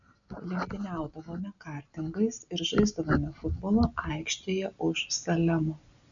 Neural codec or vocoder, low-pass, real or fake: none; 7.2 kHz; real